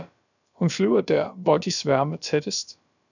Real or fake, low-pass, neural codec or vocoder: fake; 7.2 kHz; codec, 16 kHz, about 1 kbps, DyCAST, with the encoder's durations